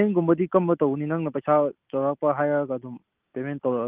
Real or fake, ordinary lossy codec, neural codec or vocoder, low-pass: real; Opus, 24 kbps; none; 3.6 kHz